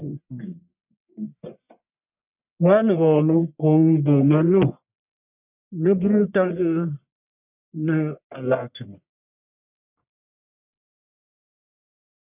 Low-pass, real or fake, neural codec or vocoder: 3.6 kHz; fake; codec, 44.1 kHz, 1.7 kbps, Pupu-Codec